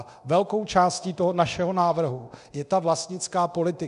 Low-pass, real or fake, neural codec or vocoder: 10.8 kHz; fake; codec, 24 kHz, 0.9 kbps, DualCodec